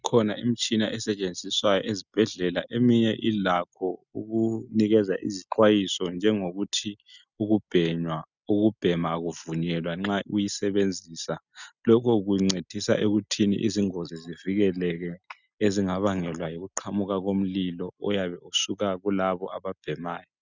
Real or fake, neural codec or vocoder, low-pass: real; none; 7.2 kHz